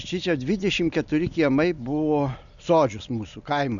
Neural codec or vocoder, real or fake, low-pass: none; real; 7.2 kHz